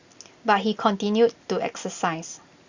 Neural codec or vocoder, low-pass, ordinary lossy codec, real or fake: none; 7.2 kHz; Opus, 64 kbps; real